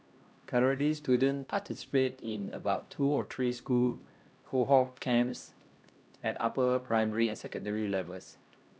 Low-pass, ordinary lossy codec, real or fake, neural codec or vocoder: none; none; fake; codec, 16 kHz, 1 kbps, X-Codec, HuBERT features, trained on LibriSpeech